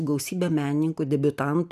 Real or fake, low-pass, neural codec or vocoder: real; 14.4 kHz; none